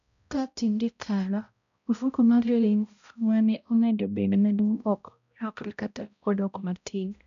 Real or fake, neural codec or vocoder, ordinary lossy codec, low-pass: fake; codec, 16 kHz, 0.5 kbps, X-Codec, HuBERT features, trained on balanced general audio; none; 7.2 kHz